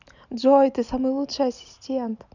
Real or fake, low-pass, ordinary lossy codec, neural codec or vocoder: real; 7.2 kHz; none; none